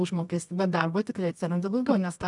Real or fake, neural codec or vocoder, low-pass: fake; codec, 24 kHz, 0.9 kbps, WavTokenizer, medium music audio release; 10.8 kHz